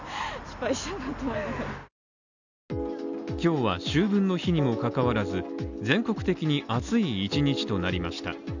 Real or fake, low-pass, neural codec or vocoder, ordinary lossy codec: real; 7.2 kHz; none; none